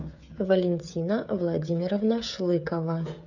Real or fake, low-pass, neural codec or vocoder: fake; 7.2 kHz; codec, 16 kHz, 16 kbps, FreqCodec, smaller model